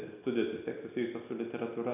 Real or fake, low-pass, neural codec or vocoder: real; 3.6 kHz; none